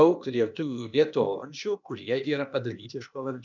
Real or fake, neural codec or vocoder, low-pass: fake; codec, 16 kHz, 0.8 kbps, ZipCodec; 7.2 kHz